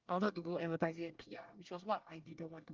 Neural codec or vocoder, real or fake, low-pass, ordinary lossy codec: codec, 24 kHz, 1 kbps, SNAC; fake; 7.2 kHz; Opus, 32 kbps